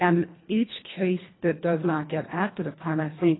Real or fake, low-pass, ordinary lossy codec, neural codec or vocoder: fake; 7.2 kHz; AAC, 16 kbps; codec, 24 kHz, 1.5 kbps, HILCodec